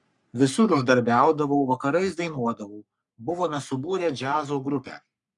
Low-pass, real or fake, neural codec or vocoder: 10.8 kHz; fake; codec, 44.1 kHz, 3.4 kbps, Pupu-Codec